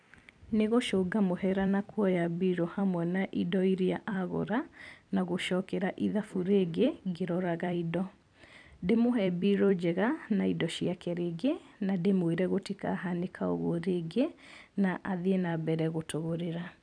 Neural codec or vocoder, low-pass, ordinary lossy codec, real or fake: vocoder, 44.1 kHz, 128 mel bands every 256 samples, BigVGAN v2; 9.9 kHz; none; fake